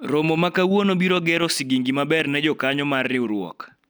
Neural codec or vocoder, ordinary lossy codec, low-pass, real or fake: none; none; none; real